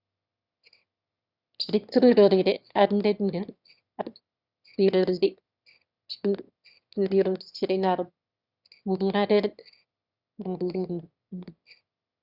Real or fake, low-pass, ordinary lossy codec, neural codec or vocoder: fake; 5.4 kHz; Opus, 64 kbps; autoencoder, 22.05 kHz, a latent of 192 numbers a frame, VITS, trained on one speaker